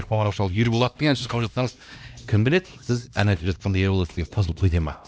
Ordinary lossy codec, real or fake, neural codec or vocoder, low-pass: none; fake; codec, 16 kHz, 1 kbps, X-Codec, HuBERT features, trained on LibriSpeech; none